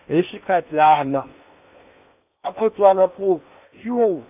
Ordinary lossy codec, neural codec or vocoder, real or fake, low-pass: none; codec, 16 kHz in and 24 kHz out, 0.8 kbps, FocalCodec, streaming, 65536 codes; fake; 3.6 kHz